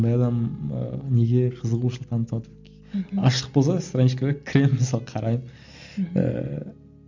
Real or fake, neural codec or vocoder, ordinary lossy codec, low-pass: real; none; MP3, 64 kbps; 7.2 kHz